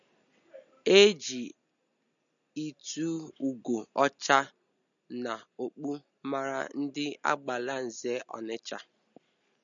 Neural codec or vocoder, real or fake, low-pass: none; real; 7.2 kHz